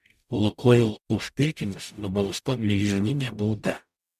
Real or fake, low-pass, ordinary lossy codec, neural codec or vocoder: fake; 14.4 kHz; MP3, 96 kbps; codec, 44.1 kHz, 0.9 kbps, DAC